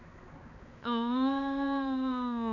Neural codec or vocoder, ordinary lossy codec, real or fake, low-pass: codec, 16 kHz, 4 kbps, X-Codec, HuBERT features, trained on balanced general audio; none; fake; 7.2 kHz